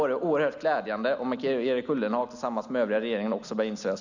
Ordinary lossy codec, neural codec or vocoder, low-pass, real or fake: none; none; 7.2 kHz; real